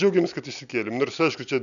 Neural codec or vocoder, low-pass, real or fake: none; 7.2 kHz; real